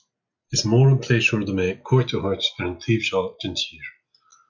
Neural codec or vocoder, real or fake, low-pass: none; real; 7.2 kHz